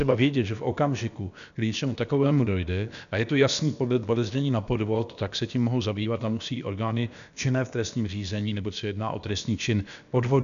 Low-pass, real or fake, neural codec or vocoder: 7.2 kHz; fake; codec, 16 kHz, about 1 kbps, DyCAST, with the encoder's durations